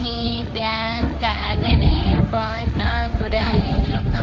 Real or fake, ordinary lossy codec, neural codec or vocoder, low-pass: fake; none; codec, 16 kHz, 1.1 kbps, Voila-Tokenizer; none